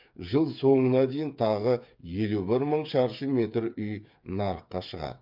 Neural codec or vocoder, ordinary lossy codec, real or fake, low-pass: codec, 16 kHz, 8 kbps, FreqCodec, smaller model; MP3, 48 kbps; fake; 5.4 kHz